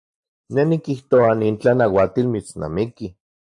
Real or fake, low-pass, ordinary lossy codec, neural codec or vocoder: real; 10.8 kHz; AAC, 64 kbps; none